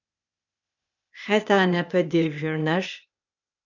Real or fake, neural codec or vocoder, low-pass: fake; codec, 16 kHz, 0.8 kbps, ZipCodec; 7.2 kHz